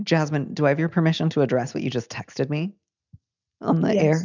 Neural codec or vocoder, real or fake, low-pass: none; real; 7.2 kHz